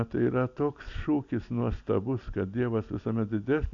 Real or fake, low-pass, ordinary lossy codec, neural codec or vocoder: real; 7.2 kHz; AAC, 48 kbps; none